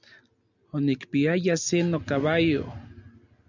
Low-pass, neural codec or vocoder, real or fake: 7.2 kHz; none; real